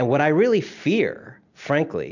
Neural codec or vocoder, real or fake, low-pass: none; real; 7.2 kHz